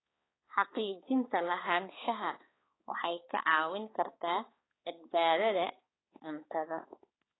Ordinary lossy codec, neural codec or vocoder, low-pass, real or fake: AAC, 16 kbps; codec, 16 kHz, 4 kbps, X-Codec, HuBERT features, trained on balanced general audio; 7.2 kHz; fake